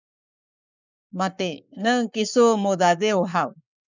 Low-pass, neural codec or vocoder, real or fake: 7.2 kHz; codec, 44.1 kHz, 7.8 kbps, Pupu-Codec; fake